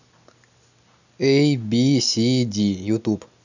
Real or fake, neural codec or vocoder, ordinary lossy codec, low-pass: real; none; none; 7.2 kHz